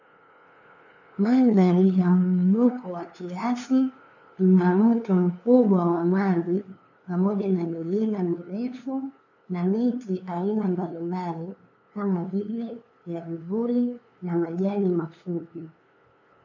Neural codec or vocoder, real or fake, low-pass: codec, 16 kHz, 2 kbps, FunCodec, trained on LibriTTS, 25 frames a second; fake; 7.2 kHz